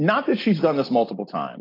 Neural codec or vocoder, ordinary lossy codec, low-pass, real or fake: none; AAC, 24 kbps; 5.4 kHz; real